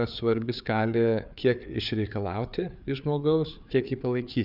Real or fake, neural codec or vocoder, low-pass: fake; codec, 16 kHz, 4 kbps, FreqCodec, larger model; 5.4 kHz